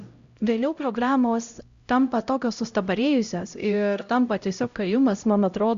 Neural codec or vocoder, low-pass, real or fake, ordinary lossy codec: codec, 16 kHz, 0.5 kbps, X-Codec, HuBERT features, trained on LibriSpeech; 7.2 kHz; fake; Opus, 64 kbps